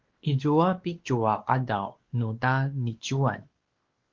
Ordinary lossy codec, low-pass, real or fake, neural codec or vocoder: Opus, 16 kbps; 7.2 kHz; fake; codec, 16 kHz, 2 kbps, X-Codec, WavLM features, trained on Multilingual LibriSpeech